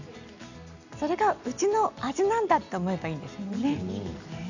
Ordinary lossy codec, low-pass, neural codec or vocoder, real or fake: none; 7.2 kHz; none; real